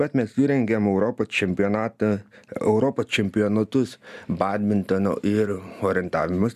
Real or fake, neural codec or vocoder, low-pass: real; none; 14.4 kHz